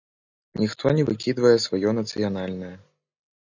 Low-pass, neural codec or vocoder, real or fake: 7.2 kHz; none; real